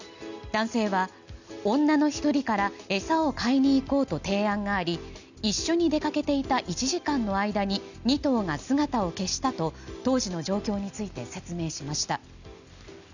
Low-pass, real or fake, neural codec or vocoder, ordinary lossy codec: 7.2 kHz; real; none; none